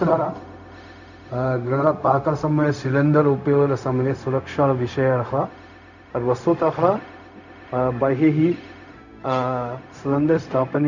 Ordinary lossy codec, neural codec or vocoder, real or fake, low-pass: none; codec, 16 kHz, 0.4 kbps, LongCat-Audio-Codec; fake; 7.2 kHz